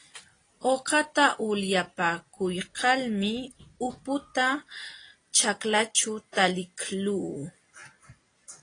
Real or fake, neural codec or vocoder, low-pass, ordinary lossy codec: real; none; 9.9 kHz; AAC, 32 kbps